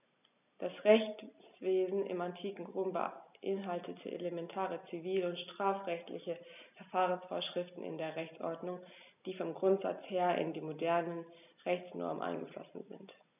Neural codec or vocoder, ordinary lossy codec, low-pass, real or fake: none; none; 3.6 kHz; real